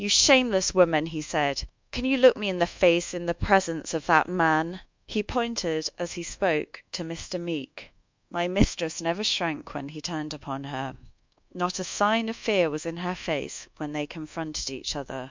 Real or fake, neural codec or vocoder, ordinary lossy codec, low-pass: fake; codec, 24 kHz, 1.2 kbps, DualCodec; MP3, 64 kbps; 7.2 kHz